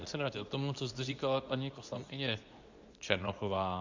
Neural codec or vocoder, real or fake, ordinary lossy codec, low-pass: codec, 24 kHz, 0.9 kbps, WavTokenizer, medium speech release version 2; fake; Opus, 64 kbps; 7.2 kHz